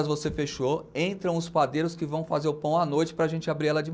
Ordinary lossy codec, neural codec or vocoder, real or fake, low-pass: none; none; real; none